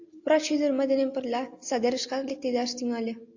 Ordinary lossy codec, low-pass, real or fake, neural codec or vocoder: AAC, 48 kbps; 7.2 kHz; real; none